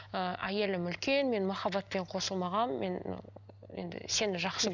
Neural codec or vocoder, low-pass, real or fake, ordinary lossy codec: none; 7.2 kHz; real; none